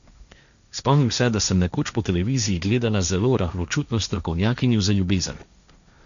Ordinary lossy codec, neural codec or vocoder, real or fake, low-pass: none; codec, 16 kHz, 1.1 kbps, Voila-Tokenizer; fake; 7.2 kHz